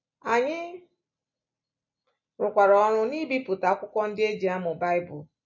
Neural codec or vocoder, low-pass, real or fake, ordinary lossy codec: none; 7.2 kHz; real; MP3, 32 kbps